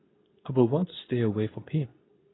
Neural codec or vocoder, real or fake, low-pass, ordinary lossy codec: codec, 24 kHz, 0.9 kbps, WavTokenizer, medium speech release version 2; fake; 7.2 kHz; AAC, 16 kbps